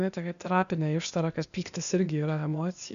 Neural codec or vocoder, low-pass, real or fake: codec, 16 kHz, 0.8 kbps, ZipCodec; 7.2 kHz; fake